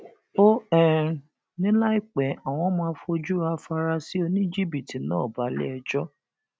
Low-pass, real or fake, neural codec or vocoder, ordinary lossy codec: none; real; none; none